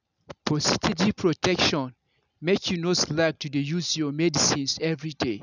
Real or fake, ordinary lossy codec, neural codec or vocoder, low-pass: real; none; none; 7.2 kHz